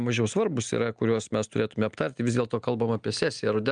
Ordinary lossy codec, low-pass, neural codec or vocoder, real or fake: Opus, 32 kbps; 9.9 kHz; none; real